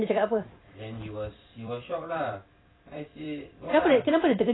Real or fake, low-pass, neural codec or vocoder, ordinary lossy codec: fake; 7.2 kHz; vocoder, 44.1 kHz, 128 mel bands every 256 samples, BigVGAN v2; AAC, 16 kbps